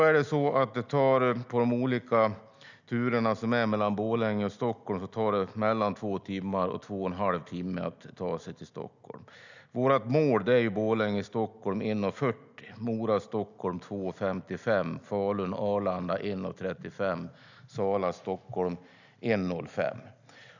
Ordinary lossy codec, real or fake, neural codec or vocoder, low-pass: none; real; none; 7.2 kHz